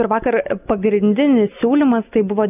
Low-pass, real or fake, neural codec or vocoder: 3.6 kHz; real; none